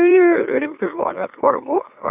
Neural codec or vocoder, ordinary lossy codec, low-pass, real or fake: autoencoder, 44.1 kHz, a latent of 192 numbers a frame, MeloTTS; AAC, 32 kbps; 3.6 kHz; fake